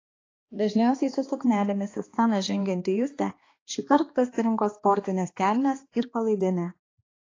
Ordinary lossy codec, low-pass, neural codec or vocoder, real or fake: AAC, 32 kbps; 7.2 kHz; codec, 16 kHz, 2 kbps, X-Codec, HuBERT features, trained on balanced general audio; fake